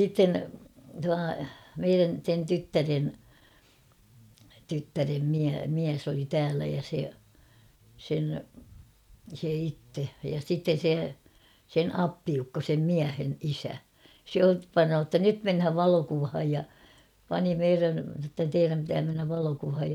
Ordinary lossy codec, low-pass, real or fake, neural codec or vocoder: none; 19.8 kHz; real; none